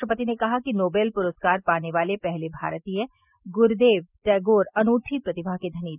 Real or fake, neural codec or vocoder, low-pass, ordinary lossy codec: real; none; 3.6 kHz; none